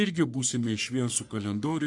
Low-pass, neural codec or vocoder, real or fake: 10.8 kHz; codec, 44.1 kHz, 3.4 kbps, Pupu-Codec; fake